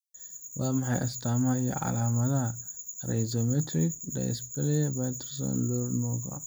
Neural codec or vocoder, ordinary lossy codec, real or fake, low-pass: none; none; real; none